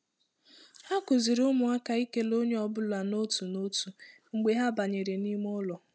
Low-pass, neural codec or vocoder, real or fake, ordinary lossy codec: none; none; real; none